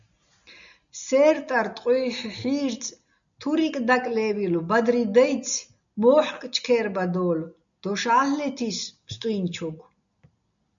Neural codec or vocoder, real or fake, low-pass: none; real; 7.2 kHz